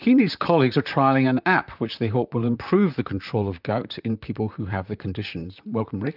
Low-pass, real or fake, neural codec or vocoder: 5.4 kHz; fake; vocoder, 44.1 kHz, 128 mel bands, Pupu-Vocoder